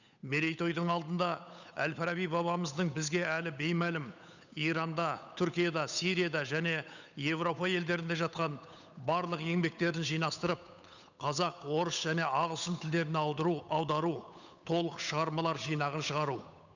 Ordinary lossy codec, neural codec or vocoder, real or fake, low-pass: none; codec, 16 kHz, 8 kbps, FunCodec, trained on Chinese and English, 25 frames a second; fake; 7.2 kHz